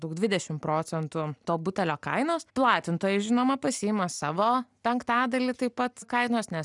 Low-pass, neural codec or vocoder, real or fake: 10.8 kHz; none; real